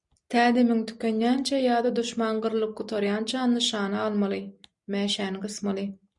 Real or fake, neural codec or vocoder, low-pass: real; none; 10.8 kHz